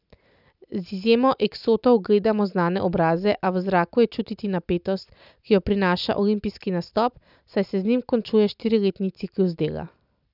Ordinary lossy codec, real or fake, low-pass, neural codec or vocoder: none; real; 5.4 kHz; none